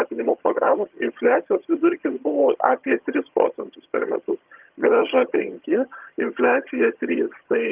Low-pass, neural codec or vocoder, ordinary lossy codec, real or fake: 3.6 kHz; vocoder, 22.05 kHz, 80 mel bands, HiFi-GAN; Opus, 32 kbps; fake